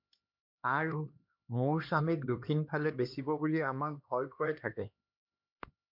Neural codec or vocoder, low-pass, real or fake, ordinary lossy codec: codec, 16 kHz, 2 kbps, X-Codec, HuBERT features, trained on LibriSpeech; 5.4 kHz; fake; MP3, 48 kbps